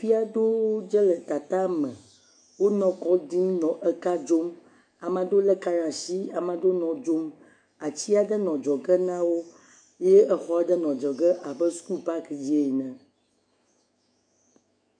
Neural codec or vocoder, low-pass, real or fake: autoencoder, 48 kHz, 128 numbers a frame, DAC-VAE, trained on Japanese speech; 9.9 kHz; fake